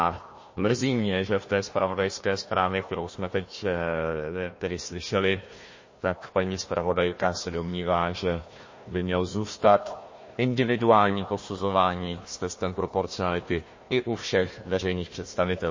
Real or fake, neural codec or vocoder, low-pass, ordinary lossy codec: fake; codec, 16 kHz, 1 kbps, FunCodec, trained on Chinese and English, 50 frames a second; 7.2 kHz; MP3, 32 kbps